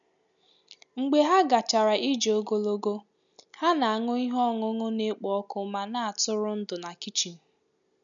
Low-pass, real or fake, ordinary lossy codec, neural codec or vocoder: 7.2 kHz; real; none; none